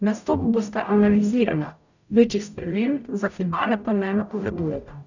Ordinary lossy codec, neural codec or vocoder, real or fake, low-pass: none; codec, 44.1 kHz, 0.9 kbps, DAC; fake; 7.2 kHz